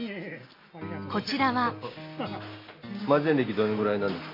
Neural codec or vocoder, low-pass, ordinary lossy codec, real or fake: none; 5.4 kHz; MP3, 32 kbps; real